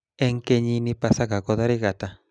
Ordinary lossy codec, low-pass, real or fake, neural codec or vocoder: none; none; real; none